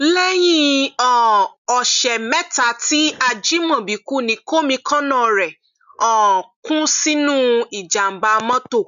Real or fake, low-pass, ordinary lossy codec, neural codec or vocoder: real; 7.2 kHz; none; none